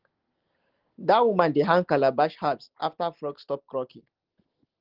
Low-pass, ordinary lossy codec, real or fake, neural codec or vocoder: 5.4 kHz; Opus, 16 kbps; real; none